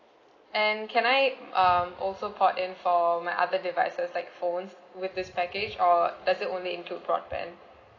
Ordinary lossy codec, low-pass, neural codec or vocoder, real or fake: AAC, 32 kbps; 7.2 kHz; none; real